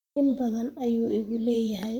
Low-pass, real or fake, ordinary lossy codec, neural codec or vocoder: 19.8 kHz; fake; none; vocoder, 44.1 kHz, 128 mel bands, Pupu-Vocoder